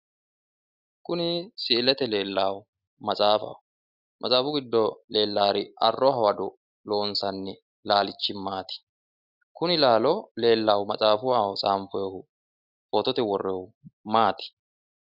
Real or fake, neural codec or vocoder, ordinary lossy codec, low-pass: real; none; Opus, 64 kbps; 5.4 kHz